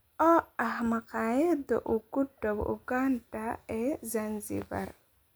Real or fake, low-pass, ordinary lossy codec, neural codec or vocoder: real; none; none; none